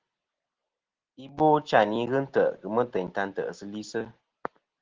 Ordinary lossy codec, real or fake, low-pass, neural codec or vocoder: Opus, 16 kbps; real; 7.2 kHz; none